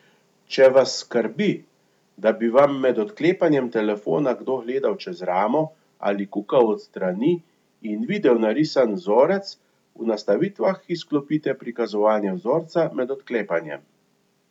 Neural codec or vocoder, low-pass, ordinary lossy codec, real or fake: none; 19.8 kHz; none; real